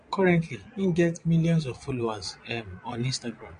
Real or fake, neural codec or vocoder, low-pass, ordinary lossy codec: fake; vocoder, 22.05 kHz, 80 mel bands, Vocos; 9.9 kHz; MP3, 48 kbps